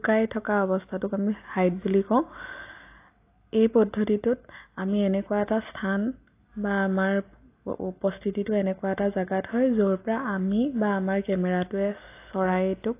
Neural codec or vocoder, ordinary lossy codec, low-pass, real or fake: none; AAC, 24 kbps; 3.6 kHz; real